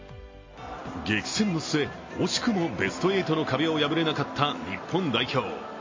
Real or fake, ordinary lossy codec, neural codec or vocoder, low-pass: real; MP3, 32 kbps; none; 7.2 kHz